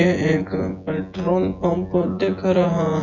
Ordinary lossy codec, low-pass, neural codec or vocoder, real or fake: none; 7.2 kHz; vocoder, 24 kHz, 100 mel bands, Vocos; fake